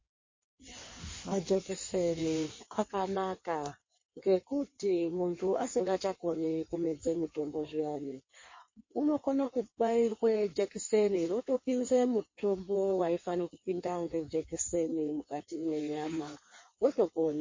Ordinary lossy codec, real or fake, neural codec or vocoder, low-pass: MP3, 32 kbps; fake; codec, 16 kHz in and 24 kHz out, 1.1 kbps, FireRedTTS-2 codec; 7.2 kHz